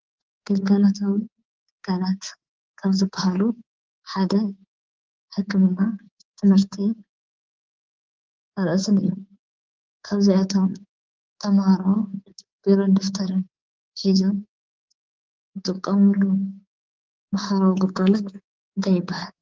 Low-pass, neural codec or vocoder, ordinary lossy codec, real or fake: 7.2 kHz; codec, 24 kHz, 3.1 kbps, DualCodec; Opus, 16 kbps; fake